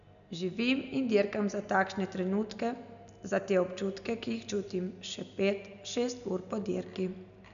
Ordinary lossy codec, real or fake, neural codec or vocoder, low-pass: MP3, 96 kbps; real; none; 7.2 kHz